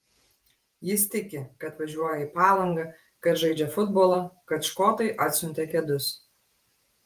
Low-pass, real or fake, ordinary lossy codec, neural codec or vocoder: 14.4 kHz; fake; Opus, 32 kbps; vocoder, 48 kHz, 128 mel bands, Vocos